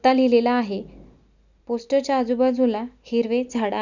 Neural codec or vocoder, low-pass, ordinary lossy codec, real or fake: none; 7.2 kHz; none; real